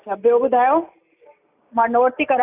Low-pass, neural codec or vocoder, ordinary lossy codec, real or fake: 3.6 kHz; none; AAC, 32 kbps; real